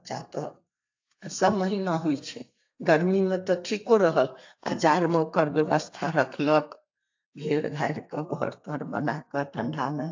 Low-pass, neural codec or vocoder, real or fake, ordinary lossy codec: 7.2 kHz; codec, 32 kHz, 1.9 kbps, SNAC; fake; AAC, 48 kbps